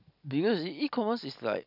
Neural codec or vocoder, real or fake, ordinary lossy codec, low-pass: none; real; none; 5.4 kHz